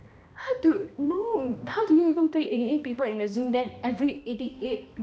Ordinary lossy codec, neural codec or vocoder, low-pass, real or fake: none; codec, 16 kHz, 1 kbps, X-Codec, HuBERT features, trained on balanced general audio; none; fake